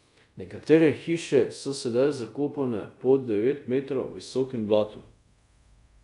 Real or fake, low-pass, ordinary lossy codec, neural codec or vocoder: fake; 10.8 kHz; none; codec, 24 kHz, 0.5 kbps, DualCodec